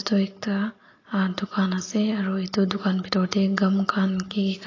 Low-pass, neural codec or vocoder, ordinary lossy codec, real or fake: 7.2 kHz; none; AAC, 32 kbps; real